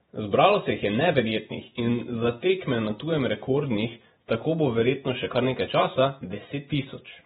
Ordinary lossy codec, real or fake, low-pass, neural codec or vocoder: AAC, 16 kbps; fake; 19.8 kHz; vocoder, 48 kHz, 128 mel bands, Vocos